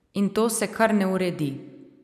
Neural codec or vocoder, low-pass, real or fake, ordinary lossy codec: none; 14.4 kHz; real; none